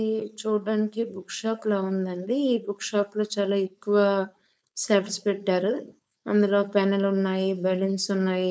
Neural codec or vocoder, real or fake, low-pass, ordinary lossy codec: codec, 16 kHz, 4.8 kbps, FACodec; fake; none; none